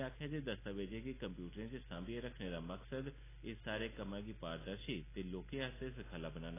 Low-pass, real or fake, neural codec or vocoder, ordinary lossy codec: 3.6 kHz; real; none; AAC, 16 kbps